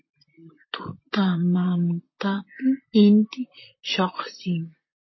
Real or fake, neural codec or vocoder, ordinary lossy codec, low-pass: fake; vocoder, 44.1 kHz, 128 mel bands, Pupu-Vocoder; MP3, 24 kbps; 7.2 kHz